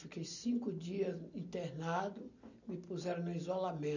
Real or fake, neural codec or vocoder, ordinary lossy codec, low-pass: real; none; none; 7.2 kHz